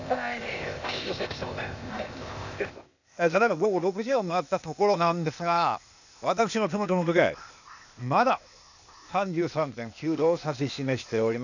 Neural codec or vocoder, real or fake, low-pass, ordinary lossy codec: codec, 16 kHz, 0.8 kbps, ZipCodec; fake; 7.2 kHz; none